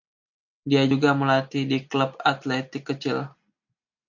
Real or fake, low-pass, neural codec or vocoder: real; 7.2 kHz; none